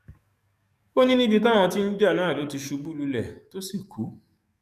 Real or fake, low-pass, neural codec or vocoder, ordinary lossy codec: fake; 14.4 kHz; codec, 44.1 kHz, 7.8 kbps, DAC; none